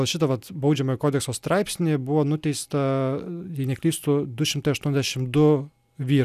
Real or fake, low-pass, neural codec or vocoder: real; 14.4 kHz; none